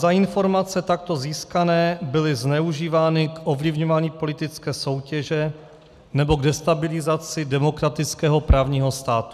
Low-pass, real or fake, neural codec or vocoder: 14.4 kHz; real; none